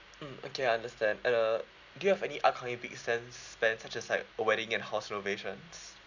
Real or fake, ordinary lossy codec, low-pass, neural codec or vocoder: real; none; 7.2 kHz; none